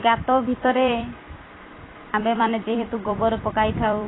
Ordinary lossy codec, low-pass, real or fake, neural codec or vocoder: AAC, 16 kbps; 7.2 kHz; fake; vocoder, 44.1 kHz, 128 mel bands every 256 samples, BigVGAN v2